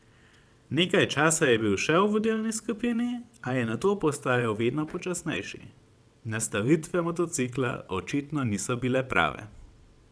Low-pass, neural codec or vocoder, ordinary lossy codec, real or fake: none; vocoder, 22.05 kHz, 80 mel bands, WaveNeXt; none; fake